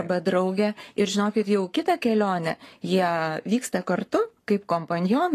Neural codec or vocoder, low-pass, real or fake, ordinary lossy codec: codec, 44.1 kHz, 7.8 kbps, Pupu-Codec; 14.4 kHz; fake; AAC, 48 kbps